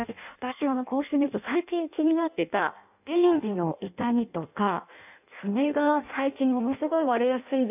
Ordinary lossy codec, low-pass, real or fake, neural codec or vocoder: none; 3.6 kHz; fake; codec, 16 kHz in and 24 kHz out, 0.6 kbps, FireRedTTS-2 codec